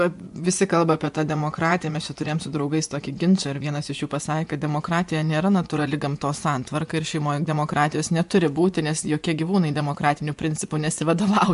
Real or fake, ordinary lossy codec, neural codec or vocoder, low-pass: fake; MP3, 64 kbps; vocoder, 24 kHz, 100 mel bands, Vocos; 10.8 kHz